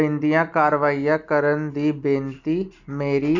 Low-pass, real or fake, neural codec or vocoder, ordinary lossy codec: 7.2 kHz; real; none; none